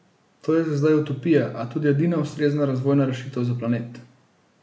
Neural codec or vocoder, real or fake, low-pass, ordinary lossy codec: none; real; none; none